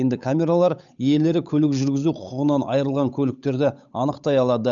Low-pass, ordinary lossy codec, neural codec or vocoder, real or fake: 7.2 kHz; none; codec, 16 kHz, 8 kbps, FunCodec, trained on Chinese and English, 25 frames a second; fake